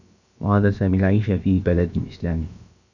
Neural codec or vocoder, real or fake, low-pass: codec, 16 kHz, about 1 kbps, DyCAST, with the encoder's durations; fake; 7.2 kHz